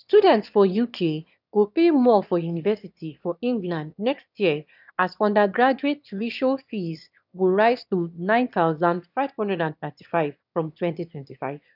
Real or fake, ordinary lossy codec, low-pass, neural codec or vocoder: fake; none; 5.4 kHz; autoencoder, 22.05 kHz, a latent of 192 numbers a frame, VITS, trained on one speaker